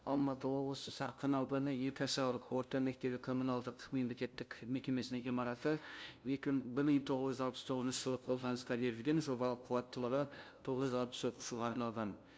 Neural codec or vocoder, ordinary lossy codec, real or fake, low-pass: codec, 16 kHz, 0.5 kbps, FunCodec, trained on LibriTTS, 25 frames a second; none; fake; none